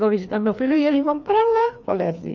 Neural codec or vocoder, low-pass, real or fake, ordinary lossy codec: codec, 16 kHz, 2 kbps, FreqCodec, larger model; 7.2 kHz; fake; none